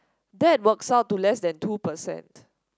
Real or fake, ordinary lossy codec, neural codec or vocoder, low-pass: real; none; none; none